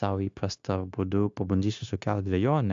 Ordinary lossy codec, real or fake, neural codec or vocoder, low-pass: AAC, 64 kbps; fake; codec, 16 kHz, 0.9 kbps, LongCat-Audio-Codec; 7.2 kHz